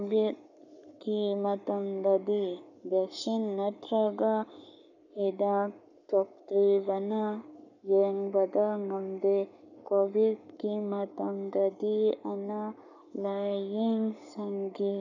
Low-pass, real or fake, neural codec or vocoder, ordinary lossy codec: 7.2 kHz; fake; codec, 44.1 kHz, 7.8 kbps, Pupu-Codec; none